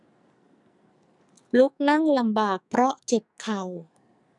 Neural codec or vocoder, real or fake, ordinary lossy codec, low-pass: codec, 44.1 kHz, 2.6 kbps, SNAC; fake; none; 10.8 kHz